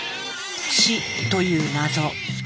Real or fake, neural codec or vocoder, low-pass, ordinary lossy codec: real; none; none; none